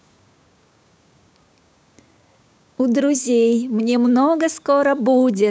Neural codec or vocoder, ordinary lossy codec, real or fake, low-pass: codec, 16 kHz, 6 kbps, DAC; none; fake; none